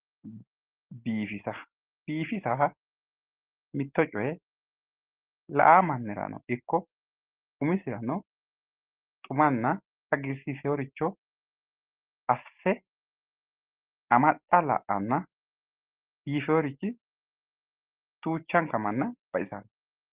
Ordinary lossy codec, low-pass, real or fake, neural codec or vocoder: Opus, 16 kbps; 3.6 kHz; real; none